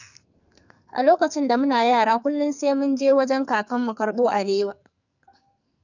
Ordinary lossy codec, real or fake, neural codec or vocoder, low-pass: none; fake; codec, 44.1 kHz, 2.6 kbps, SNAC; 7.2 kHz